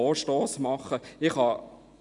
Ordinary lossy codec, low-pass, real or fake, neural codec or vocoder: none; 10.8 kHz; real; none